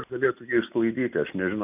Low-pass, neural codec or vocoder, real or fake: 3.6 kHz; none; real